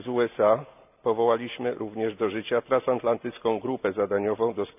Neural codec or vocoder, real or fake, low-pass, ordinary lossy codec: none; real; 3.6 kHz; none